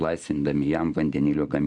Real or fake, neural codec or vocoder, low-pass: real; none; 10.8 kHz